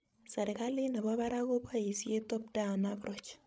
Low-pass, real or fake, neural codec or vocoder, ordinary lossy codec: none; fake; codec, 16 kHz, 16 kbps, FreqCodec, larger model; none